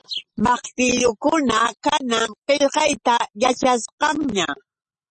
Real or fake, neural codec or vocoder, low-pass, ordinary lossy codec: real; none; 9.9 kHz; MP3, 32 kbps